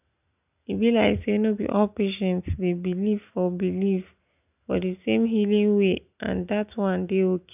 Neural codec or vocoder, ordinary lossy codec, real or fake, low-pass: none; none; real; 3.6 kHz